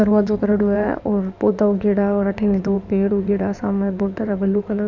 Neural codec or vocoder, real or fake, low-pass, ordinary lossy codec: codec, 16 kHz in and 24 kHz out, 2.2 kbps, FireRedTTS-2 codec; fake; 7.2 kHz; none